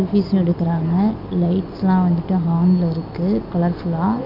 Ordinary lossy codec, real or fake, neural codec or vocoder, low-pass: none; real; none; 5.4 kHz